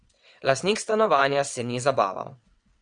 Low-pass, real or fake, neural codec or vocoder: 9.9 kHz; fake; vocoder, 22.05 kHz, 80 mel bands, WaveNeXt